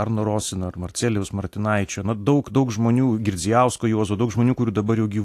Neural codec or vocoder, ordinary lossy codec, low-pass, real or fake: none; AAC, 64 kbps; 14.4 kHz; real